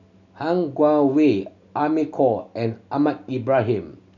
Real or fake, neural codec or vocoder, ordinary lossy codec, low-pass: real; none; none; 7.2 kHz